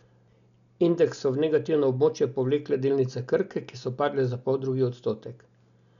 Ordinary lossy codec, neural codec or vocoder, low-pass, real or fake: none; none; 7.2 kHz; real